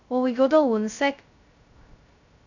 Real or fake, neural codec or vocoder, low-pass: fake; codec, 16 kHz, 0.2 kbps, FocalCodec; 7.2 kHz